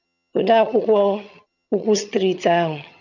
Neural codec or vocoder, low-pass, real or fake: vocoder, 22.05 kHz, 80 mel bands, HiFi-GAN; 7.2 kHz; fake